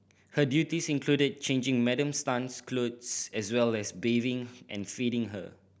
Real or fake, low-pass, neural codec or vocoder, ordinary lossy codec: real; none; none; none